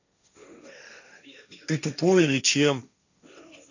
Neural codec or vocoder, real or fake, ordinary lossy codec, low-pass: codec, 16 kHz, 1.1 kbps, Voila-Tokenizer; fake; none; 7.2 kHz